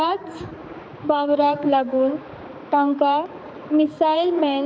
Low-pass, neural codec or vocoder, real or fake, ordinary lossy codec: none; codec, 16 kHz, 4 kbps, X-Codec, HuBERT features, trained on balanced general audio; fake; none